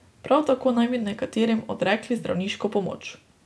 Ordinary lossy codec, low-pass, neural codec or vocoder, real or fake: none; none; none; real